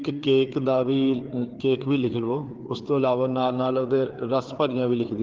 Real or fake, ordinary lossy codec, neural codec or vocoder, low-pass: fake; Opus, 16 kbps; codec, 16 kHz, 4 kbps, FreqCodec, larger model; 7.2 kHz